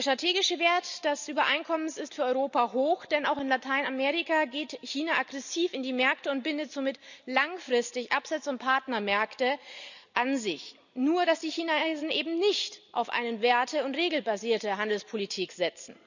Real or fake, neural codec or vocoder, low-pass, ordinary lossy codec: real; none; 7.2 kHz; none